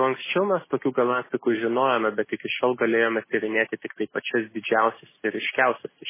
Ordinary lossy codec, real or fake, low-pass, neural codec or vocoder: MP3, 16 kbps; real; 3.6 kHz; none